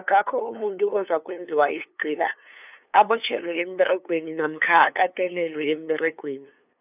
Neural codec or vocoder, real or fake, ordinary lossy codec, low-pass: codec, 16 kHz, 2 kbps, FunCodec, trained on LibriTTS, 25 frames a second; fake; none; 3.6 kHz